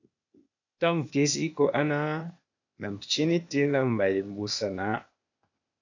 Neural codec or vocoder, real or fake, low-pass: codec, 16 kHz, 0.8 kbps, ZipCodec; fake; 7.2 kHz